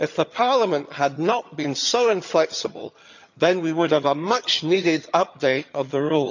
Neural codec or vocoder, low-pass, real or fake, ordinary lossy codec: vocoder, 22.05 kHz, 80 mel bands, HiFi-GAN; 7.2 kHz; fake; none